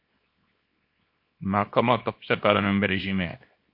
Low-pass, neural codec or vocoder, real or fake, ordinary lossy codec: 5.4 kHz; codec, 24 kHz, 0.9 kbps, WavTokenizer, small release; fake; MP3, 32 kbps